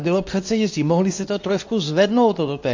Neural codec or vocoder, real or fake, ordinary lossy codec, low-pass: codec, 24 kHz, 0.9 kbps, WavTokenizer, medium speech release version 2; fake; AAC, 48 kbps; 7.2 kHz